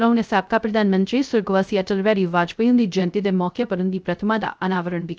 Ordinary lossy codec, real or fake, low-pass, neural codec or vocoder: none; fake; none; codec, 16 kHz, 0.3 kbps, FocalCodec